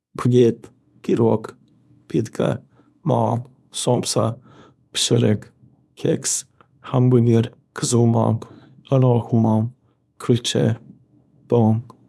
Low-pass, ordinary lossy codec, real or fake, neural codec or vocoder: none; none; fake; codec, 24 kHz, 0.9 kbps, WavTokenizer, small release